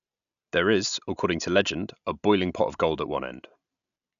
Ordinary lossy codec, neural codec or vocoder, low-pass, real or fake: none; none; 7.2 kHz; real